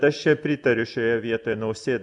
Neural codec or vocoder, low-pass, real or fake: none; 10.8 kHz; real